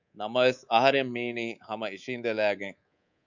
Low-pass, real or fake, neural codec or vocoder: 7.2 kHz; fake; codec, 24 kHz, 3.1 kbps, DualCodec